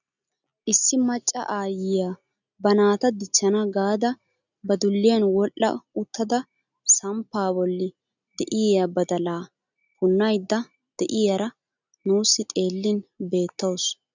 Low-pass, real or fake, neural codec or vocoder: 7.2 kHz; real; none